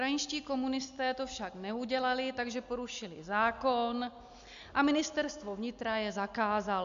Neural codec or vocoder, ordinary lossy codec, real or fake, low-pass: none; AAC, 64 kbps; real; 7.2 kHz